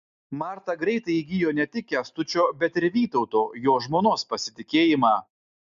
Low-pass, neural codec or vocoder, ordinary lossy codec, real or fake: 7.2 kHz; none; MP3, 64 kbps; real